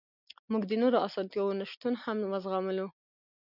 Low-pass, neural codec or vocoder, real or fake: 5.4 kHz; none; real